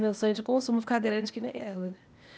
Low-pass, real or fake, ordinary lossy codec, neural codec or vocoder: none; fake; none; codec, 16 kHz, 0.8 kbps, ZipCodec